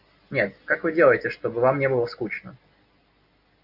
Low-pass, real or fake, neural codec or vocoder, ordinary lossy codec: 5.4 kHz; real; none; Opus, 64 kbps